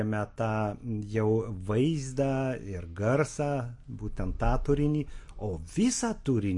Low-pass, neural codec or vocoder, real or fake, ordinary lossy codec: 10.8 kHz; none; real; MP3, 48 kbps